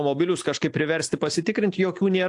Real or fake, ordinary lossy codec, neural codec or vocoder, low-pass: real; AAC, 64 kbps; none; 10.8 kHz